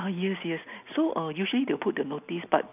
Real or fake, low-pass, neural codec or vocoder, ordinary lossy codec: real; 3.6 kHz; none; none